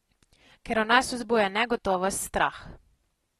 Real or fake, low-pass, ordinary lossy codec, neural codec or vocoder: real; 19.8 kHz; AAC, 32 kbps; none